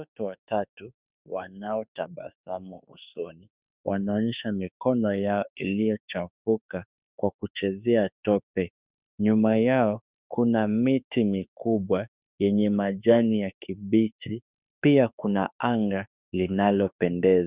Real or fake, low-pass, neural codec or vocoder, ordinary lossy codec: fake; 3.6 kHz; autoencoder, 48 kHz, 32 numbers a frame, DAC-VAE, trained on Japanese speech; Opus, 64 kbps